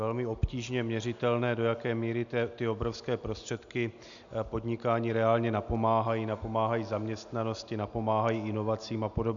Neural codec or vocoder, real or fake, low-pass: none; real; 7.2 kHz